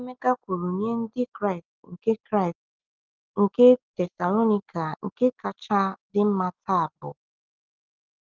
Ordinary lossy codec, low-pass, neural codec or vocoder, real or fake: Opus, 32 kbps; 7.2 kHz; none; real